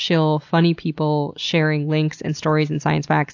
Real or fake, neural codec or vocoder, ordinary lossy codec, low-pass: real; none; AAC, 48 kbps; 7.2 kHz